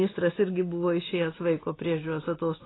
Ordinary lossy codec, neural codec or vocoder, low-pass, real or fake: AAC, 16 kbps; none; 7.2 kHz; real